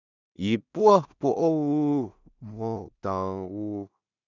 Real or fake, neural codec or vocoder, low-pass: fake; codec, 16 kHz in and 24 kHz out, 0.4 kbps, LongCat-Audio-Codec, two codebook decoder; 7.2 kHz